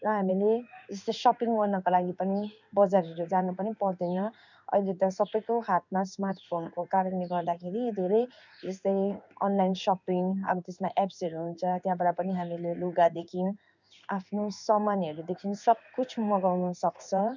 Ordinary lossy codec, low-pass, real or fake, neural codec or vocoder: none; 7.2 kHz; fake; codec, 16 kHz in and 24 kHz out, 1 kbps, XY-Tokenizer